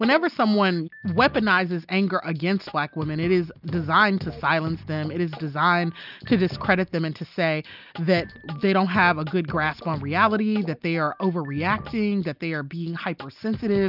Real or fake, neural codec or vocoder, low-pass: real; none; 5.4 kHz